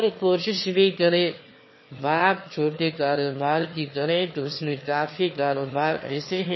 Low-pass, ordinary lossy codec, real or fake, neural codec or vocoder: 7.2 kHz; MP3, 24 kbps; fake; autoencoder, 22.05 kHz, a latent of 192 numbers a frame, VITS, trained on one speaker